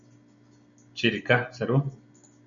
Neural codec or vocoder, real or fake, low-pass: none; real; 7.2 kHz